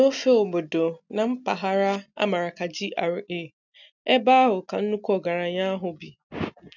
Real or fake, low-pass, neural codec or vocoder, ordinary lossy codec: real; 7.2 kHz; none; none